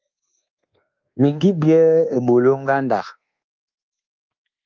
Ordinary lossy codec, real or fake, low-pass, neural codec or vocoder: Opus, 24 kbps; fake; 7.2 kHz; codec, 24 kHz, 1.2 kbps, DualCodec